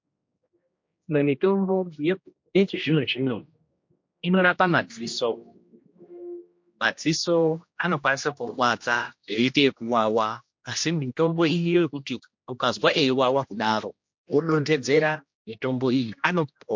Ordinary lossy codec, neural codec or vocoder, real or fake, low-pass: MP3, 48 kbps; codec, 16 kHz, 1 kbps, X-Codec, HuBERT features, trained on general audio; fake; 7.2 kHz